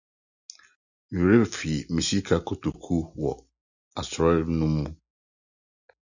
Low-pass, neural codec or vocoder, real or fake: 7.2 kHz; none; real